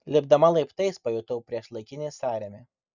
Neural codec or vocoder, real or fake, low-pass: none; real; 7.2 kHz